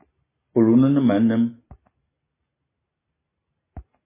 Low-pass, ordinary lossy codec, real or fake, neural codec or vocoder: 3.6 kHz; MP3, 16 kbps; real; none